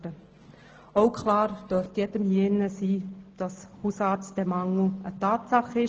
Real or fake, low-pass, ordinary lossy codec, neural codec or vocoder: real; 7.2 kHz; Opus, 16 kbps; none